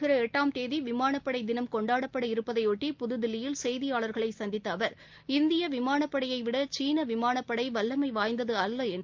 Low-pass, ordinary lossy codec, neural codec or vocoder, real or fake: 7.2 kHz; Opus, 32 kbps; none; real